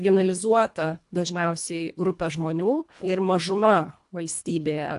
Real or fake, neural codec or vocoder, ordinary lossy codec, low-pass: fake; codec, 24 kHz, 1.5 kbps, HILCodec; AAC, 64 kbps; 10.8 kHz